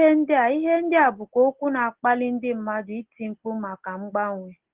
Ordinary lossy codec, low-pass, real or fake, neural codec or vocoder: Opus, 16 kbps; 3.6 kHz; real; none